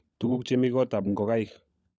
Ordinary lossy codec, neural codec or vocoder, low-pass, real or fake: none; codec, 16 kHz, 16 kbps, FunCodec, trained on LibriTTS, 50 frames a second; none; fake